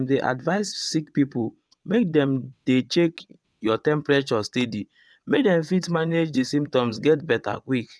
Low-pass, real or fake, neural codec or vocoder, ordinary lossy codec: none; fake; vocoder, 22.05 kHz, 80 mel bands, WaveNeXt; none